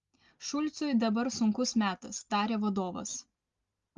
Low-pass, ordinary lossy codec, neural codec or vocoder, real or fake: 7.2 kHz; Opus, 32 kbps; none; real